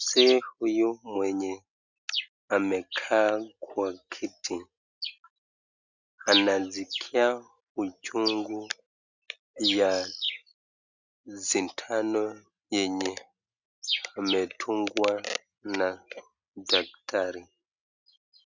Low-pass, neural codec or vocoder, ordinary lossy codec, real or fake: 7.2 kHz; none; Opus, 64 kbps; real